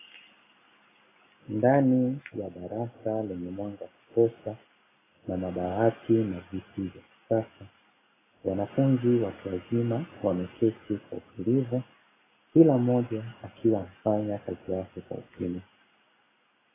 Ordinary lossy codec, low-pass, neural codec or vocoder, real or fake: AAC, 16 kbps; 3.6 kHz; none; real